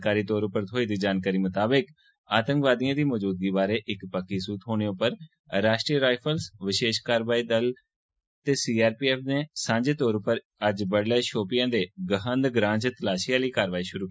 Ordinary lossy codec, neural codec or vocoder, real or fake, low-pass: none; none; real; none